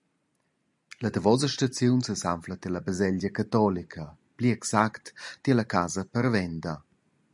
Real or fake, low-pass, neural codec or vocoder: real; 10.8 kHz; none